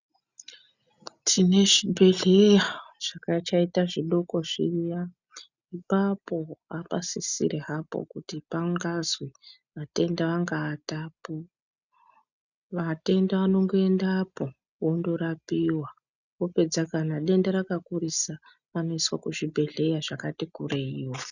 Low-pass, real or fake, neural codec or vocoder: 7.2 kHz; real; none